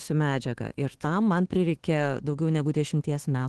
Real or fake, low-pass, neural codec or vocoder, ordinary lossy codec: fake; 10.8 kHz; codec, 24 kHz, 1.2 kbps, DualCodec; Opus, 16 kbps